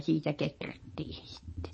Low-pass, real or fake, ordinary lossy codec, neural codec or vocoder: 9.9 kHz; fake; MP3, 32 kbps; vocoder, 22.05 kHz, 80 mel bands, WaveNeXt